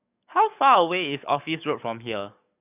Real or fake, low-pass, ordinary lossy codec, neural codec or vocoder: fake; 3.6 kHz; none; codec, 44.1 kHz, 7.8 kbps, DAC